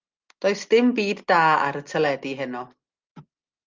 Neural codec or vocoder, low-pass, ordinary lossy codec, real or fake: none; 7.2 kHz; Opus, 24 kbps; real